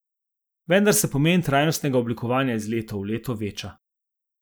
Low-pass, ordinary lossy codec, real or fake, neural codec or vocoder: none; none; real; none